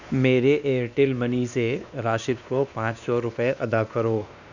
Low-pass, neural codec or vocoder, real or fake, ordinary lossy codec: 7.2 kHz; codec, 16 kHz, 2 kbps, X-Codec, WavLM features, trained on Multilingual LibriSpeech; fake; none